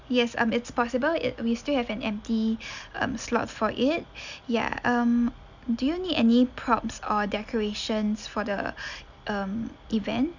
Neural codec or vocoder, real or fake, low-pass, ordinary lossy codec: none; real; 7.2 kHz; none